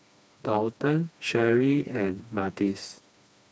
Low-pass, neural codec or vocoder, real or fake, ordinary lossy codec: none; codec, 16 kHz, 2 kbps, FreqCodec, smaller model; fake; none